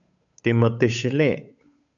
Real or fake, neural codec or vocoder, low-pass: fake; codec, 16 kHz, 8 kbps, FunCodec, trained on Chinese and English, 25 frames a second; 7.2 kHz